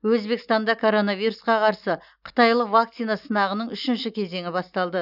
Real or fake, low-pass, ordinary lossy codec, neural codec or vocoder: real; 5.4 kHz; none; none